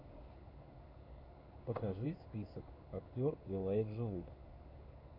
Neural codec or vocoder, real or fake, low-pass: codec, 16 kHz in and 24 kHz out, 1 kbps, XY-Tokenizer; fake; 5.4 kHz